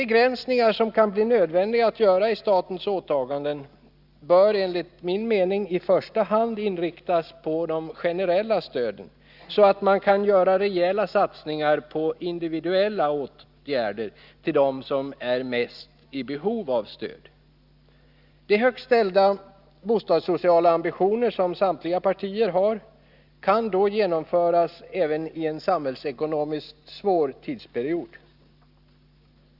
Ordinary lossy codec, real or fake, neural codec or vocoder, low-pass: Opus, 64 kbps; real; none; 5.4 kHz